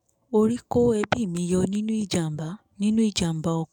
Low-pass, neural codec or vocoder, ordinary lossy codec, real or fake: 19.8 kHz; codec, 44.1 kHz, 7.8 kbps, DAC; none; fake